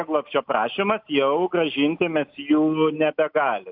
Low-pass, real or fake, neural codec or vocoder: 5.4 kHz; real; none